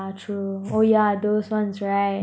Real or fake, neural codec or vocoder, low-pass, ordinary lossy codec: real; none; none; none